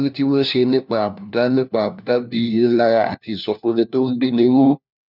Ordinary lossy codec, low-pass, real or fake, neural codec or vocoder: none; 5.4 kHz; fake; codec, 16 kHz, 1 kbps, FunCodec, trained on LibriTTS, 50 frames a second